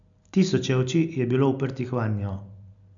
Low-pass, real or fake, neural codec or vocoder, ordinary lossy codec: 7.2 kHz; real; none; none